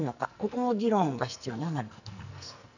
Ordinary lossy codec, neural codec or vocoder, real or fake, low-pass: none; codec, 44.1 kHz, 2.6 kbps, SNAC; fake; 7.2 kHz